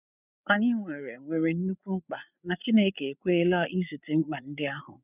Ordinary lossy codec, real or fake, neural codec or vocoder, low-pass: none; fake; codec, 16 kHz, 8 kbps, FreqCodec, larger model; 3.6 kHz